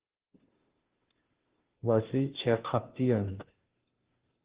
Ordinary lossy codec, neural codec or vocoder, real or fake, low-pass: Opus, 16 kbps; codec, 16 kHz, 0.5 kbps, FunCodec, trained on Chinese and English, 25 frames a second; fake; 3.6 kHz